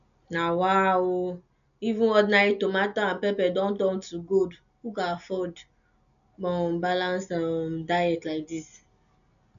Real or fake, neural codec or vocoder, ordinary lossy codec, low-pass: real; none; none; 7.2 kHz